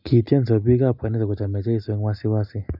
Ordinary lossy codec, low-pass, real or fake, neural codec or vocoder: none; 5.4 kHz; real; none